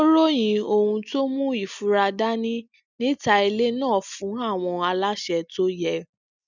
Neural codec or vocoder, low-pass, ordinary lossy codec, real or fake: none; 7.2 kHz; none; real